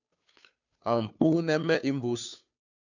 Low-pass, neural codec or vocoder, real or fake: 7.2 kHz; codec, 16 kHz, 2 kbps, FunCodec, trained on Chinese and English, 25 frames a second; fake